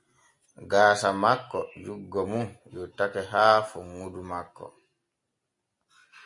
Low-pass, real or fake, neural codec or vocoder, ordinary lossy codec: 10.8 kHz; real; none; MP3, 48 kbps